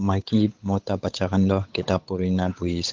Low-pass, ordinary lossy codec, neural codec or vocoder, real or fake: 7.2 kHz; Opus, 16 kbps; codec, 16 kHz, 8 kbps, FunCodec, trained on Chinese and English, 25 frames a second; fake